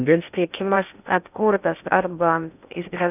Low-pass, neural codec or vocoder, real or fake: 3.6 kHz; codec, 16 kHz in and 24 kHz out, 0.6 kbps, FocalCodec, streaming, 2048 codes; fake